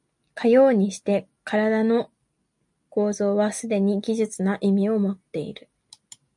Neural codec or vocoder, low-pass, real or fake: none; 10.8 kHz; real